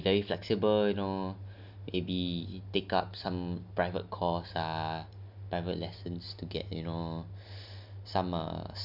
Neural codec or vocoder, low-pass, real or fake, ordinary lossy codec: none; 5.4 kHz; real; none